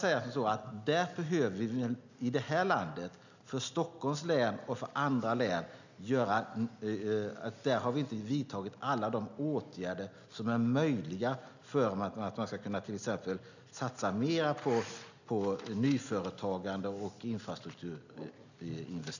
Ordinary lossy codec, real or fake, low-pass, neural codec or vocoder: none; real; 7.2 kHz; none